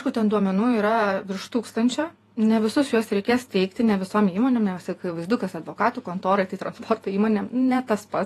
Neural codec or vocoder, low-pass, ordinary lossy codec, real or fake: vocoder, 48 kHz, 128 mel bands, Vocos; 14.4 kHz; AAC, 48 kbps; fake